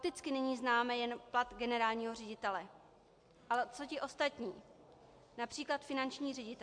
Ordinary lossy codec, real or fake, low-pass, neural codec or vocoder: MP3, 64 kbps; real; 9.9 kHz; none